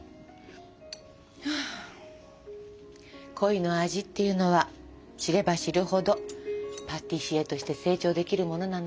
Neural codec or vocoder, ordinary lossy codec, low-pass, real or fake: none; none; none; real